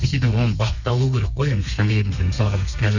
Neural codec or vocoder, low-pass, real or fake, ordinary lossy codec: codec, 32 kHz, 1.9 kbps, SNAC; 7.2 kHz; fake; none